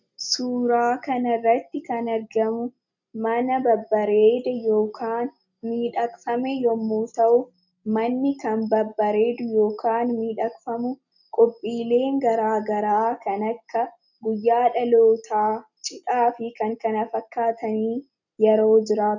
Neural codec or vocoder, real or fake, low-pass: none; real; 7.2 kHz